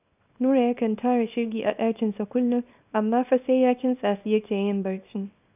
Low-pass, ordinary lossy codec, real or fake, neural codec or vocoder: 3.6 kHz; none; fake; codec, 24 kHz, 0.9 kbps, WavTokenizer, small release